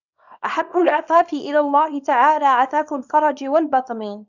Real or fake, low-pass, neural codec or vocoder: fake; 7.2 kHz; codec, 24 kHz, 0.9 kbps, WavTokenizer, small release